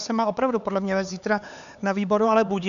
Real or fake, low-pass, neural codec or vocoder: fake; 7.2 kHz; codec, 16 kHz, 4 kbps, X-Codec, HuBERT features, trained on LibriSpeech